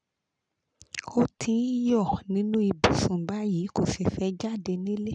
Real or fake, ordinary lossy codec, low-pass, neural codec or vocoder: real; none; 9.9 kHz; none